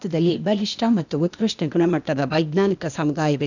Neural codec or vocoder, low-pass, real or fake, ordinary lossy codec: codec, 16 kHz, 0.8 kbps, ZipCodec; 7.2 kHz; fake; none